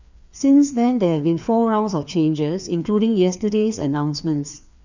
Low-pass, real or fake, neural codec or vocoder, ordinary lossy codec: 7.2 kHz; fake; codec, 16 kHz, 2 kbps, FreqCodec, larger model; none